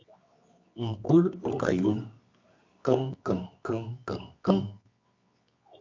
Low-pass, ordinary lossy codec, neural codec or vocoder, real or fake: 7.2 kHz; MP3, 48 kbps; codec, 24 kHz, 0.9 kbps, WavTokenizer, medium music audio release; fake